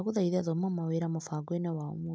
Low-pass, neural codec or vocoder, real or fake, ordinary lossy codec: none; none; real; none